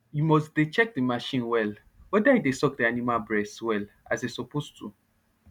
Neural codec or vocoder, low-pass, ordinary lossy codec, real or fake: none; 19.8 kHz; none; real